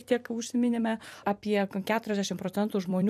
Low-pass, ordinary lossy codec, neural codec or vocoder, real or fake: 14.4 kHz; AAC, 96 kbps; vocoder, 44.1 kHz, 128 mel bands every 256 samples, BigVGAN v2; fake